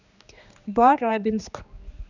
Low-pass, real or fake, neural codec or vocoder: 7.2 kHz; fake; codec, 16 kHz, 2 kbps, X-Codec, HuBERT features, trained on general audio